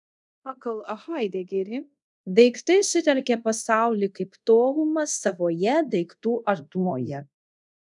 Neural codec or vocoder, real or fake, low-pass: codec, 24 kHz, 0.5 kbps, DualCodec; fake; 10.8 kHz